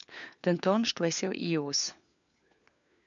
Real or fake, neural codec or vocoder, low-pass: fake; codec, 16 kHz, 4 kbps, FunCodec, trained on LibriTTS, 50 frames a second; 7.2 kHz